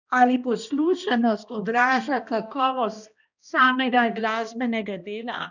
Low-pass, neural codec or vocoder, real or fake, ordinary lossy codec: 7.2 kHz; codec, 16 kHz, 1 kbps, X-Codec, HuBERT features, trained on balanced general audio; fake; none